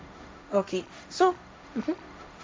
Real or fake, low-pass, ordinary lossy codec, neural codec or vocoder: fake; none; none; codec, 16 kHz, 1.1 kbps, Voila-Tokenizer